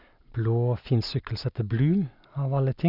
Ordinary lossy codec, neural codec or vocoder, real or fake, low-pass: none; none; real; 5.4 kHz